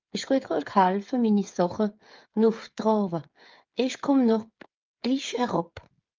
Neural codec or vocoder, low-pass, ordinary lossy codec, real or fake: codec, 16 kHz, 8 kbps, FreqCodec, smaller model; 7.2 kHz; Opus, 32 kbps; fake